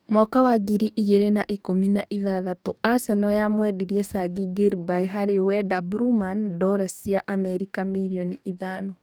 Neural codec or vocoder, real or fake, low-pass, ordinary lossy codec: codec, 44.1 kHz, 2.6 kbps, DAC; fake; none; none